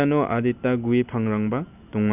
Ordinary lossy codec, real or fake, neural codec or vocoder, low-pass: none; real; none; 3.6 kHz